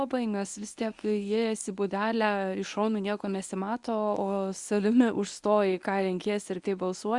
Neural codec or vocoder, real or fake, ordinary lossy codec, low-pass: codec, 24 kHz, 0.9 kbps, WavTokenizer, medium speech release version 1; fake; Opus, 64 kbps; 10.8 kHz